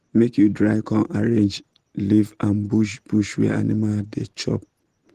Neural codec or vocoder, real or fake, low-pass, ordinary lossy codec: none; real; 14.4 kHz; Opus, 16 kbps